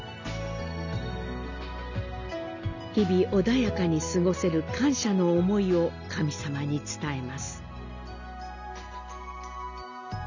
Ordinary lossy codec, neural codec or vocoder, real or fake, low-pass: none; none; real; 7.2 kHz